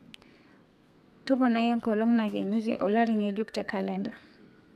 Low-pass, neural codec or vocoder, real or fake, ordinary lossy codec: 14.4 kHz; codec, 32 kHz, 1.9 kbps, SNAC; fake; none